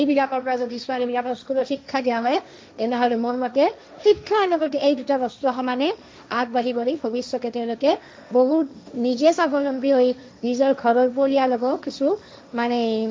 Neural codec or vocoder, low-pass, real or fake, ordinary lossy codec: codec, 16 kHz, 1.1 kbps, Voila-Tokenizer; none; fake; none